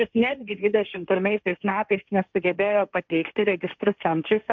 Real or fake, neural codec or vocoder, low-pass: fake; codec, 16 kHz, 1.1 kbps, Voila-Tokenizer; 7.2 kHz